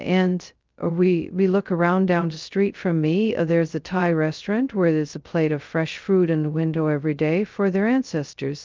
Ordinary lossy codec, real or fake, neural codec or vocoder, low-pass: Opus, 32 kbps; fake; codec, 16 kHz, 0.2 kbps, FocalCodec; 7.2 kHz